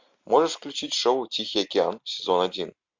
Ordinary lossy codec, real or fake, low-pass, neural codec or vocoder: MP3, 48 kbps; real; 7.2 kHz; none